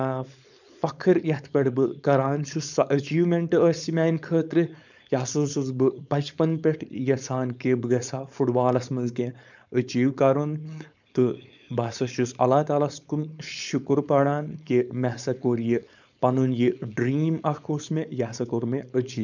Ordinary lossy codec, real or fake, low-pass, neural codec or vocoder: none; fake; 7.2 kHz; codec, 16 kHz, 4.8 kbps, FACodec